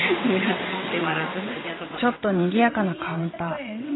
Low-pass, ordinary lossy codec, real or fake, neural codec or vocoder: 7.2 kHz; AAC, 16 kbps; fake; vocoder, 22.05 kHz, 80 mel bands, WaveNeXt